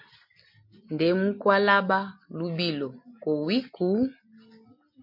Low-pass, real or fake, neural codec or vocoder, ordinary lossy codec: 5.4 kHz; real; none; MP3, 32 kbps